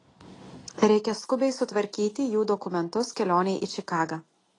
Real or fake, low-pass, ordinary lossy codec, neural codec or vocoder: real; 10.8 kHz; AAC, 32 kbps; none